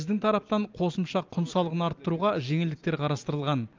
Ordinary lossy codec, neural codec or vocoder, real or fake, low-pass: Opus, 24 kbps; none; real; 7.2 kHz